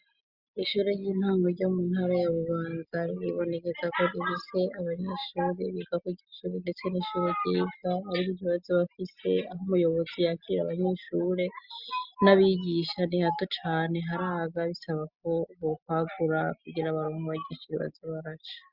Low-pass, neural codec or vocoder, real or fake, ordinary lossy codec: 5.4 kHz; none; real; Opus, 64 kbps